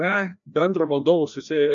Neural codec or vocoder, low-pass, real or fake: codec, 16 kHz, 1 kbps, FreqCodec, larger model; 7.2 kHz; fake